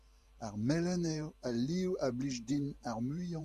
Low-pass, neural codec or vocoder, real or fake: 14.4 kHz; vocoder, 44.1 kHz, 128 mel bands every 512 samples, BigVGAN v2; fake